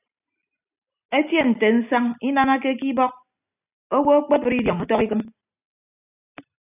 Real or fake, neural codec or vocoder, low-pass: real; none; 3.6 kHz